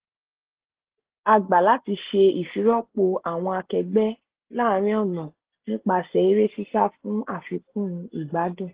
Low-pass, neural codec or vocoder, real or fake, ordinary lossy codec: 3.6 kHz; none; real; Opus, 16 kbps